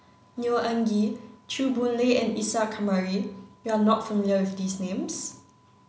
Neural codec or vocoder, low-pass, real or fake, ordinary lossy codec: none; none; real; none